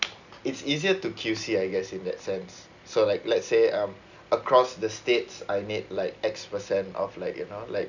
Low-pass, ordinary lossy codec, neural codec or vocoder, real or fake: 7.2 kHz; none; none; real